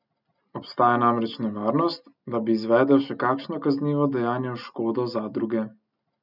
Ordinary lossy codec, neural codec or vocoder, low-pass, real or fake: none; none; 5.4 kHz; real